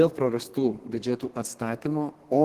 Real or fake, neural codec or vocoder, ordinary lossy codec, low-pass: fake; codec, 32 kHz, 1.9 kbps, SNAC; Opus, 16 kbps; 14.4 kHz